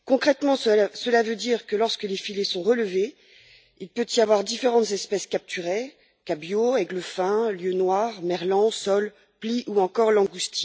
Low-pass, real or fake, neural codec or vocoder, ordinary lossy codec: none; real; none; none